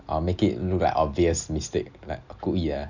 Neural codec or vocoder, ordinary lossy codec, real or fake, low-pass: none; none; real; 7.2 kHz